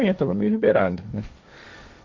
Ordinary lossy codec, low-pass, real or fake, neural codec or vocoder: MP3, 48 kbps; 7.2 kHz; fake; codec, 16 kHz, 1.1 kbps, Voila-Tokenizer